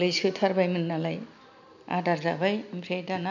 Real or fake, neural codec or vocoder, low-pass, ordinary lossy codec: real; none; 7.2 kHz; none